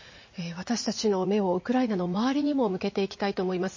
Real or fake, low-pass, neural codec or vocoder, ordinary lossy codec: fake; 7.2 kHz; vocoder, 44.1 kHz, 80 mel bands, Vocos; MP3, 32 kbps